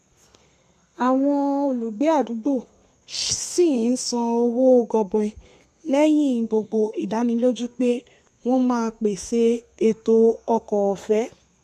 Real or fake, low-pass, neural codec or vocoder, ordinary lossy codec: fake; 14.4 kHz; codec, 32 kHz, 1.9 kbps, SNAC; none